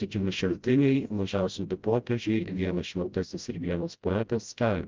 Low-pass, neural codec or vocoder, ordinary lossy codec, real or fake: 7.2 kHz; codec, 16 kHz, 0.5 kbps, FreqCodec, smaller model; Opus, 24 kbps; fake